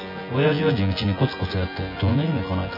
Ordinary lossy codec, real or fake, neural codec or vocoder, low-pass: none; fake; vocoder, 24 kHz, 100 mel bands, Vocos; 5.4 kHz